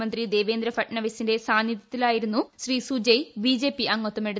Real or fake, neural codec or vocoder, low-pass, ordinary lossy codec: real; none; none; none